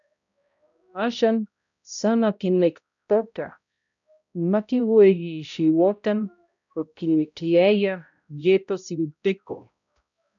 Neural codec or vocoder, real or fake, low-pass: codec, 16 kHz, 0.5 kbps, X-Codec, HuBERT features, trained on balanced general audio; fake; 7.2 kHz